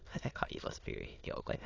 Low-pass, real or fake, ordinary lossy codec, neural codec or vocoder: 7.2 kHz; fake; AAC, 32 kbps; autoencoder, 22.05 kHz, a latent of 192 numbers a frame, VITS, trained on many speakers